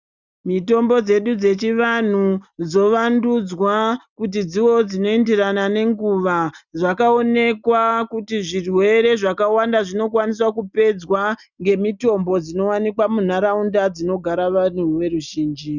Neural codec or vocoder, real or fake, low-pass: none; real; 7.2 kHz